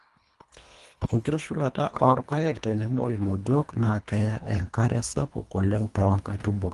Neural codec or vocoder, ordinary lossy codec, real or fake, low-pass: codec, 24 kHz, 1.5 kbps, HILCodec; Opus, 32 kbps; fake; 10.8 kHz